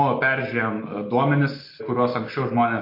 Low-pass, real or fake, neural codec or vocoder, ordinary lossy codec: 5.4 kHz; real; none; MP3, 32 kbps